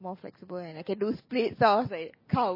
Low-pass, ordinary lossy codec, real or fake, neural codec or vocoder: 5.4 kHz; MP3, 24 kbps; real; none